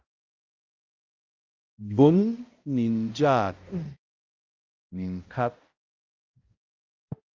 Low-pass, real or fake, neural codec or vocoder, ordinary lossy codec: 7.2 kHz; fake; codec, 16 kHz, 0.5 kbps, X-Codec, HuBERT features, trained on balanced general audio; Opus, 24 kbps